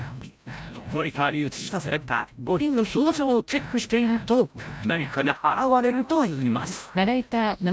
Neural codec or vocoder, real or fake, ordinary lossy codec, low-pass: codec, 16 kHz, 0.5 kbps, FreqCodec, larger model; fake; none; none